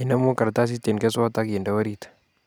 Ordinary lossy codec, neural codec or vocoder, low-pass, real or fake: none; none; none; real